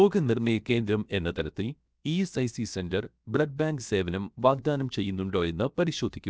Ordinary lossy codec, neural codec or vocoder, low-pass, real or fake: none; codec, 16 kHz, 0.7 kbps, FocalCodec; none; fake